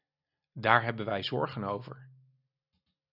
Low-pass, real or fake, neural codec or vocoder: 5.4 kHz; real; none